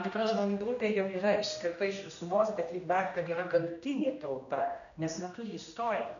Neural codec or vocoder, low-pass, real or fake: codec, 16 kHz, 1 kbps, X-Codec, HuBERT features, trained on general audio; 7.2 kHz; fake